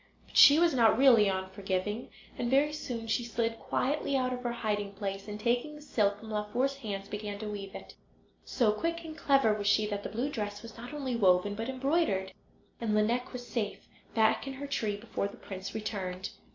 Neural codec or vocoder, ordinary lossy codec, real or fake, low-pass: none; MP3, 48 kbps; real; 7.2 kHz